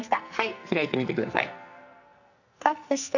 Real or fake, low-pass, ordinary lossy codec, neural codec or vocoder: fake; 7.2 kHz; none; codec, 44.1 kHz, 2.6 kbps, SNAC